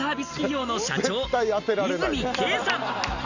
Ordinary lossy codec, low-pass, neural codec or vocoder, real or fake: none; 7.2 kHz; none; real